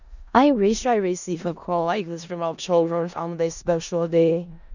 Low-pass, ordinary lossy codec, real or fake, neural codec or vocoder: 7.2 kHz; none; fake; codec, 16 kHz in and 24 kHz out, 0.4 kbps, LongCat-Audio-Codec, four codebook decoder